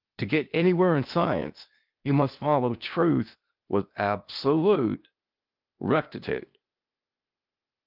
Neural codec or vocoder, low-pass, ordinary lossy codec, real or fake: codec, 16 kHz, 0.8 kbps, ZipCodec; 5.4 kHz; Opus, 32 kbps; fake